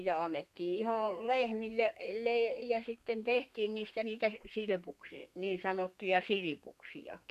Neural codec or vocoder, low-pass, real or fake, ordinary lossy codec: codec, 32 kHz, 1.9 kbps, SNAC; 14.4 kHz; fake; none